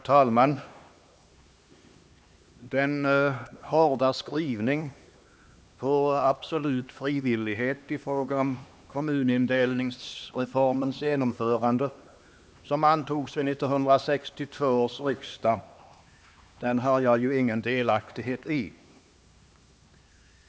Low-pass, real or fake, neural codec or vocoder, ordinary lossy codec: none; fake; codec, 16 kHz, 2 kbps, X-Codec, HuBERT features, trained on LibriSpeech; none